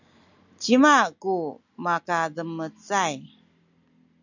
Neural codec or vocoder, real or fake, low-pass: none; real; 7.2 kHz